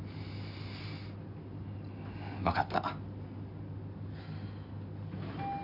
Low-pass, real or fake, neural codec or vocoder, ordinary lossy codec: 5.4 kHz; real; none; none